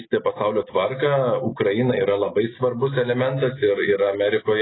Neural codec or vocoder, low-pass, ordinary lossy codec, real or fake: none; 7.2 kHz; AAC, 16 kbps; real